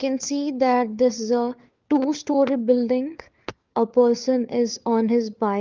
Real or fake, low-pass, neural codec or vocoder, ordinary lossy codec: fake; 7.2 kHz; codec, 16 kHz, 4 kbps, FunCodec, trained on LibriTTS, 50 frames a second; Opus, 32 kbps